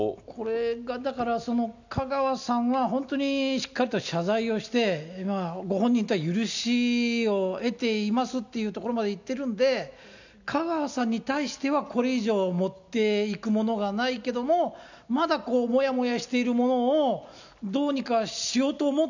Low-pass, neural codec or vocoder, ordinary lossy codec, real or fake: 7.2 kHz; none; none; real